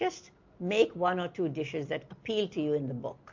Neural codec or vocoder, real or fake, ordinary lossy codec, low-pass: none; real; MP3, 48 kbps; 7.2 kHz